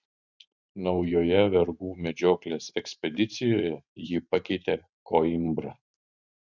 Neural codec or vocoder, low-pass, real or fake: vocoder, 44.1 kHz, 128 mel bands every 512 samples, BigVGAN v2; 7.2 kHz; fake